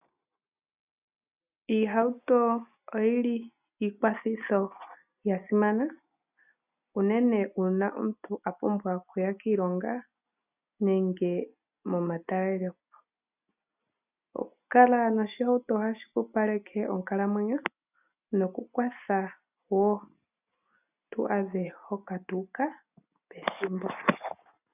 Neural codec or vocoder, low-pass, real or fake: none; 3.6 kHz; real